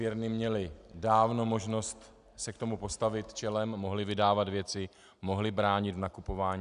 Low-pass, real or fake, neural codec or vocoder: 10.8 kHz; real; none